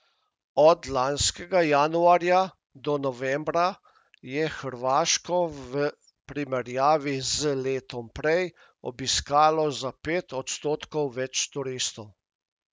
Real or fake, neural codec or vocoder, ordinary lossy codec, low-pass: real; none; none; none